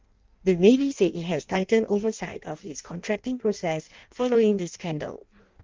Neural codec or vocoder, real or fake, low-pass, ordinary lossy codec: codec, 16 kHz in and 24 kHz out, 0.6 kbps, FireRedTTS-2 codec; fake; 7.2 kHz; Opus, 32 kbps